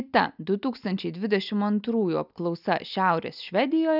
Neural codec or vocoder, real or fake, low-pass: none; real; 5.4 kHz